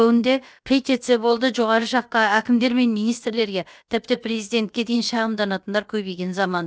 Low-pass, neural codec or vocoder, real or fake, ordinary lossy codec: none; codec, 16 kHz, about 1 kbps, DyCAST, with the encoder's durations; fake; none